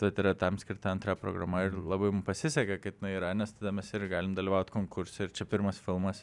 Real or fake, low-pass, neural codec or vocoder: real; 10.8 kHz; none